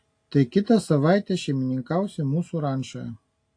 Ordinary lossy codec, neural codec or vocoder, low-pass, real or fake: AAC, 48 kbps; none; 9.9 kHz; real